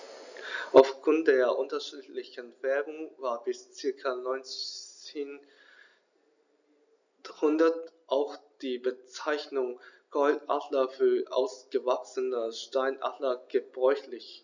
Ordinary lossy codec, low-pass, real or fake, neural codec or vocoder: none; 7.2 kHz; real; none